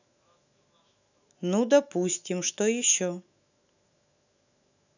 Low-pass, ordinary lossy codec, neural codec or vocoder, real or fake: 7.2 kHz; none; autoencoder, 48 kHz, 128 numbers a frame, DAC-VAE, trained on Japanese speech; fake